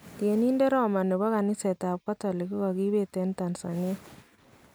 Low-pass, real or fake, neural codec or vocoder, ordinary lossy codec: none; real; none; none